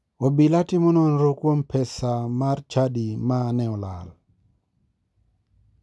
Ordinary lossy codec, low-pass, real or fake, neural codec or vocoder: none; none; real; none